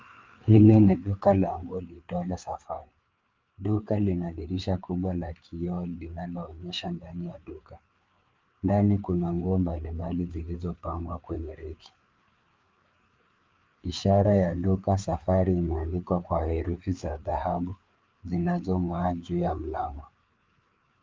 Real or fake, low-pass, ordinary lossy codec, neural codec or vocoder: fake; 7.2 kHz; Opus, 24 kbps; codec, 16 kHz, 4 kbps, FreqCodec, larger model